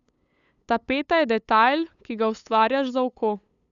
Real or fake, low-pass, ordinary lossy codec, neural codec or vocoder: fake; 7.2 kHz; none; codec, 16 kHz, 8 kbps, FunCodec, trained on LibriTTS, 25 frames a second